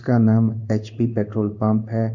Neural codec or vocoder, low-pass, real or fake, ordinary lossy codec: codec, 16 kHz in and 24 kHz out, 1 kbps, XY-Tokenizer; 7.2 kHz; fake; none